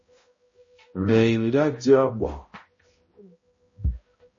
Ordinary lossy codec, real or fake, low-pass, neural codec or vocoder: MP3, 32 kbps; fake; 7.2 kHz; codec, 16 kHz, 0.5 kbps, X-Codec, HuBERT features, trained on balanced general audio